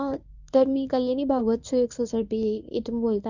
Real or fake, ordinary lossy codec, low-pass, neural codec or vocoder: fake; none; 7.2 kHz; codec, 24 kHz, 0.9 kbps, WavTokenizer, medium speech release version 1